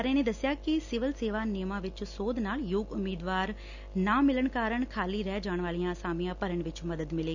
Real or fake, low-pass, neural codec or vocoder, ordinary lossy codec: real; 7.2 kHz; none; none